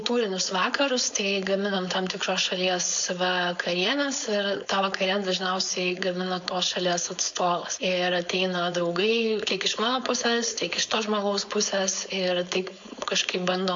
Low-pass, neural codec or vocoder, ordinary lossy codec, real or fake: 7.2 kHz; codec, 16 kHz, 4.8 kbps, FACodec; AAC, 64 kbps; fake